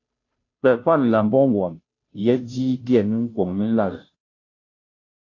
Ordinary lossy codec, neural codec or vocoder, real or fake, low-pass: AAC, 48 kbps; codec, 16 kHz, 0.5 kbps, FunCodec, trained on Chinese and English, 25 frames a second; fake; 7.2 kHz